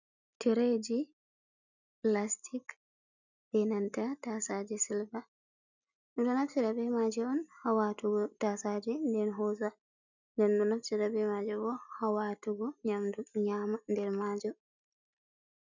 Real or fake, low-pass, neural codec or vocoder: real; 7.2 kHz; none